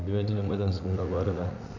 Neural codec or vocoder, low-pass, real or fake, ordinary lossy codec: vocoder, 44.1 kHz, 80 mel bands, Vocos; 7.2 kHz; fake; none